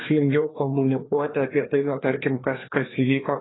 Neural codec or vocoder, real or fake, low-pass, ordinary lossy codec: codec, 16 kHz, 2 kbps, FreqCodec, larger model; fake; 7.2 kHz; AAC, 16 kbps